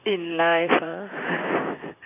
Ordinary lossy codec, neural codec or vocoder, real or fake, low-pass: none; vocoder, 44.1 kHz, 128 mel bands, Pupu-Vocoder; fake; 3.6 kHz